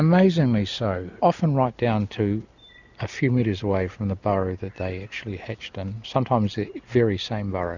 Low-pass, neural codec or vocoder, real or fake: 7.2 kHz; none; real